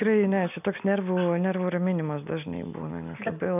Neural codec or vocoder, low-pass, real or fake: none; 3.6 kHz; real